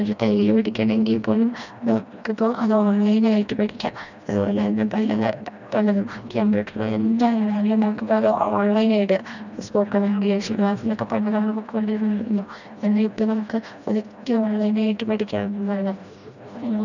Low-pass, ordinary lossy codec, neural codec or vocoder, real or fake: 7.2 kHz; none; codec, 16 kHz, 1 kbps, FreqCodec, smaller model; fake